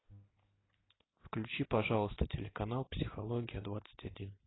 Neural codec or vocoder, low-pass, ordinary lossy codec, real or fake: none; 7.2 kHz; AAC, 16 kbps; real